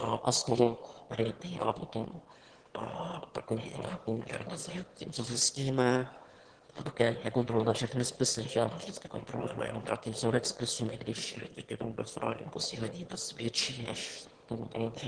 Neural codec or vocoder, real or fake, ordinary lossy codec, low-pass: autoencoder, 22.05 kHz, a latent of 192 numbers a frame, VITS, trained on one speaker; fake; Opus, 16 kbps; 9.9 kHz